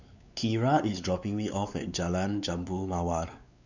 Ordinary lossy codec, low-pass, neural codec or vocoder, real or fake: none; 7.2 kHz; codec, 16 kHz, 4 kbps, X-Codec, WavLM features, trained on Multilingual LibriSpeech; fake